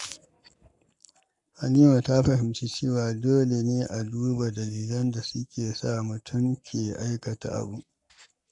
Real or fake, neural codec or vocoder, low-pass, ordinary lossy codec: fake; codec, 44.1 kHz, 7.8 kbps, Pupu-Codec; 10.8 kHz; none